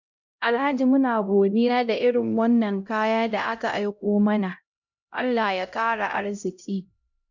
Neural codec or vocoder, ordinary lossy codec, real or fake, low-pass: codec, 16 kHz, 0.5 kbps, X-Codec, HuBERT features, trained on LibriSpeech; none; fake; 7.2 kHz